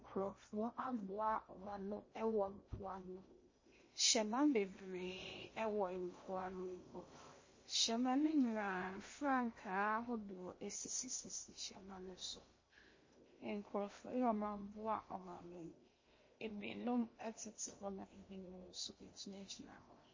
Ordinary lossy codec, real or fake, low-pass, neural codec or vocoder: MP3, 32 kbps; fake; 7.2 kHz; codec, 16 kHz in and 24 kHz out, 0.8 kbps, FocalCodec, streaming, 65536 codes